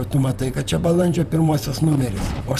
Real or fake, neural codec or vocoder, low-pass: fake; vocoder, 44.1 kHz, 128 mel bands, Pupu-Vocoder; 14.4 kHz